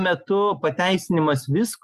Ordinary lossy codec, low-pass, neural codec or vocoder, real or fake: Opus, 64 kbps; 14.4 kHz; none; real